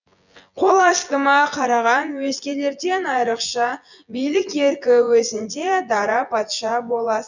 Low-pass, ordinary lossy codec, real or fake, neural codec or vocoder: 7.2 kHz; none; fake; vocoder, 24 kHz, 100 mel bands, Vocos